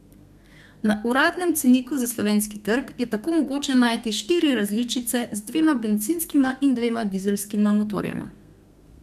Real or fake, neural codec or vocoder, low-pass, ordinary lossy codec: fake; codec, 32 kHz, 1.9 kbps, SNAC; 14.4 kHz; none